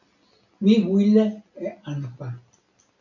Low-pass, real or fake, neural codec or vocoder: 7.2 kHz; real; none